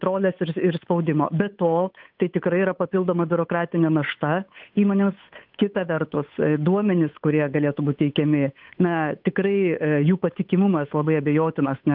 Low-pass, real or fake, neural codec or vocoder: 5.4 kHz; fake; codec, 16 kHz, 8 kbps, FunCodec, trained on Chinese and English, 25 frames a second